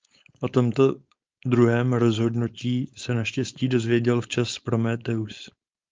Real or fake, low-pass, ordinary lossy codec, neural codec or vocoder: fake; 7.2 kHz; Opus, 24 kbps; codec, 16 kHz, 4.8 kbps, FACodec